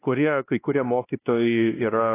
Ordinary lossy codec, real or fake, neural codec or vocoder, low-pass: AAC, 16 kbps; fake; codec, 16 kHz, 1 kbps, X-Codec, WavLM features, trained on Multilingual LibriSpeech; 3.6 kHz